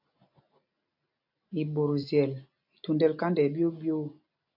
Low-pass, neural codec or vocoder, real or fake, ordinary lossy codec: 5.4 kHz; none; real; AAC, 48 kbps